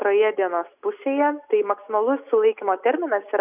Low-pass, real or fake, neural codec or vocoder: 3.6 kHz; real; none